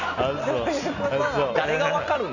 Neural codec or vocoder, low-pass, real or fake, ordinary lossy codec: none; 7.2 kHz; real; none